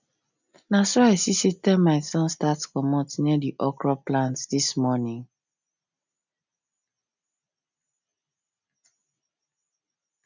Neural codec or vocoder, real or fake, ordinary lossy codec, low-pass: none; real; none; 7.2 kHz